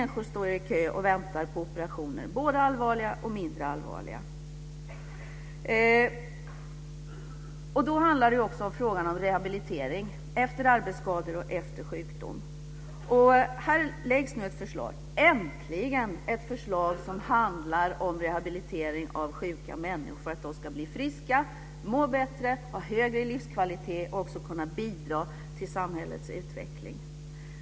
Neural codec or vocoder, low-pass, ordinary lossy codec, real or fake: none; none; none; real